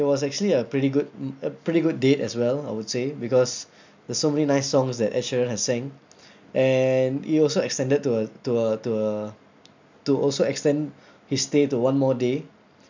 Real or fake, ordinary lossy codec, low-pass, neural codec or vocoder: real; MP3, 64 kbps; 7.2 kHz; none